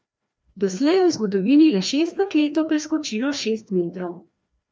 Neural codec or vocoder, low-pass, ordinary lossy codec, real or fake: codec, 16 kHz, 1 kbps, FreqCodec, larger model; none; none; fake